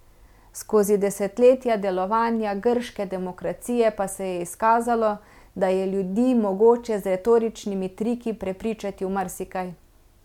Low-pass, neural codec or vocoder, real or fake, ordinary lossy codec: 19.8 kHz; none; real; MP3, 96 kbps